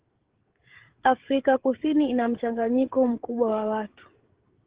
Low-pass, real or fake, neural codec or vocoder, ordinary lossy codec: 3.6 kHz; fake; codec, 16 kHz, 16 kbps, FreqCodec, smaller model; Opus, 16 kbps